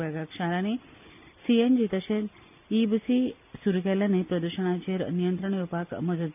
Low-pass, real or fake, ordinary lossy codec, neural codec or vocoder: 3.6 kHz; real; none; none